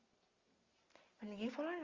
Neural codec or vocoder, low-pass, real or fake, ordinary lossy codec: none; 7.2 kHz; real; Opus, 32 kbps